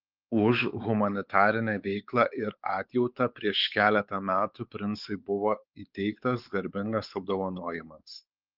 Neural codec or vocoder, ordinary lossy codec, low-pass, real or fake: codec, 16 kHz, 4 kbps, X-Codec, WavLM features, trained on Multilingual LibriSpeech; Opus, 32 kbps; 5.4 kHz; fake